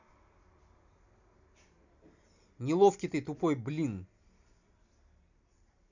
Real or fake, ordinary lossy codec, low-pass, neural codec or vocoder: real; none; 7.2 kHz; none